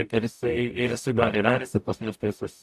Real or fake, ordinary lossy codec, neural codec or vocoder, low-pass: fake; AAC, 96 kbps; codec, 44.1 kHz, 0.9 kbps, DAC; 14.4 kHz